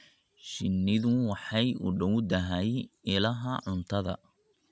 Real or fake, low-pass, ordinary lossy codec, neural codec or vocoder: real; none; none; none